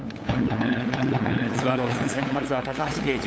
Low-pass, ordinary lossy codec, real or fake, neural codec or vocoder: none; none; fake; codec, 16 kHz, 8 kbps, FunCodec, trained on LibriTTS, 25 frames a second